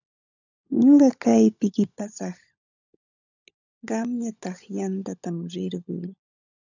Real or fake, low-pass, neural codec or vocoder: fake; 7.2 kHz; codec, 16 kHz, 16 kbps, FunCodec, trained on LibriTTS, 50 frames a second